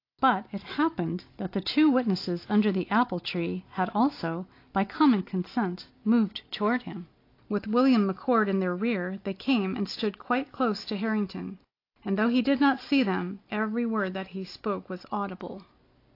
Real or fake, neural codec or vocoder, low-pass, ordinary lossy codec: real; none; 5.4 kHz; AAC, 32 kbps